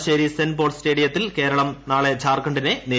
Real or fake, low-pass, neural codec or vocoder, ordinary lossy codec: real; none; none; none